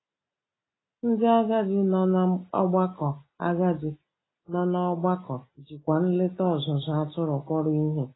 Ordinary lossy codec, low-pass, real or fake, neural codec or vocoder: AAC, 16 kbps; 7.2 kHz; real; none